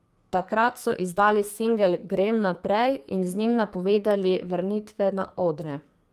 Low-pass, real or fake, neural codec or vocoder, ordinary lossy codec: 14.4 kHz; fake; codec, 44.1 kHz, 2.6 kbps, SNAC; Opus, 32 kbps